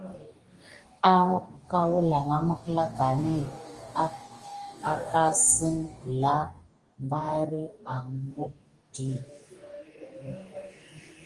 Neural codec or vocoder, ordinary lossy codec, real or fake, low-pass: codec, 44.1 kHz, 2.6 kbps, DAC; Opus, 24 kbps; fake; 10.8 kHz